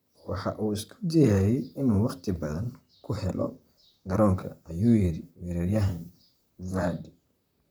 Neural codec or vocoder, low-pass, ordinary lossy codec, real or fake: vocoder, 44.1 kHz, 128 mel bands, Pupu-Vocoder; none; none; fake